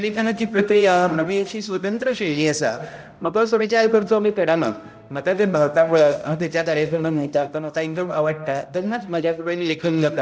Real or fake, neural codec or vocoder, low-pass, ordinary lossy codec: fake; codec, 16 kHz, 0.5 kbps, X-Codec, HuBERT features, trained on balanced general audio; none; none